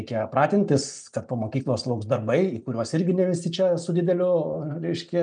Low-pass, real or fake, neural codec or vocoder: 9.9 kHz; real; none